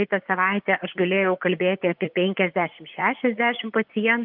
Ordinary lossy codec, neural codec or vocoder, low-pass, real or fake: Opus, 32 kbps; vocoder, 24 kHz, 100 mel bands, Vocos; 5.4 kHz; fake